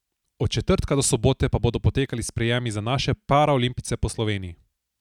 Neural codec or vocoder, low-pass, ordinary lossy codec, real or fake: none; 19.8 kHz; none; real